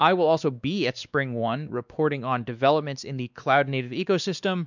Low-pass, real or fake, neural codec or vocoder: 7.2 kHz; fake; codec, 16 kHz, 2 kbps, X-Codec, WavLM features, trained on Multilingual LibriSpeech